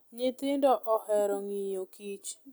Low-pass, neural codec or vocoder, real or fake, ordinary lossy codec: none; none; real; none